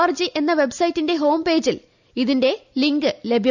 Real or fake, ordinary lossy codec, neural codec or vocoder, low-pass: real; none; none; 7.2 kHz